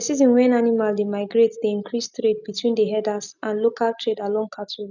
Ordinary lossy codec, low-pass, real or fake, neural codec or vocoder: none; 7.2 kHz; real; none